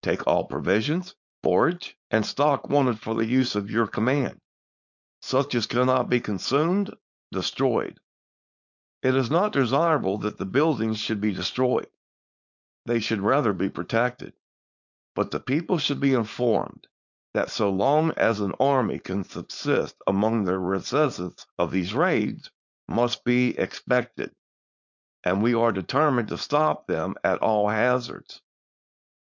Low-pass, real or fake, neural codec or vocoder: 7.2 kHz; fake; codec, 16 kHz, 4.8 kbps, FACodec